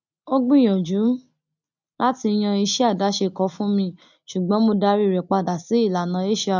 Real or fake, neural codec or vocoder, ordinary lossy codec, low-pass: real; none; none; 7.2 kHz